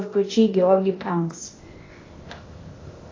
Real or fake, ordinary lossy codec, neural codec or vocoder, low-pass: fake; AAC, 32 kbps; codec, 16 kHz, 0.8 kbps, ZipCodec; 7.2 kHz